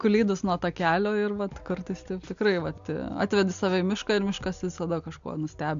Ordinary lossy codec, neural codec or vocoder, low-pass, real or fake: MP3, 64 kbps; none; 7.2 kHz; real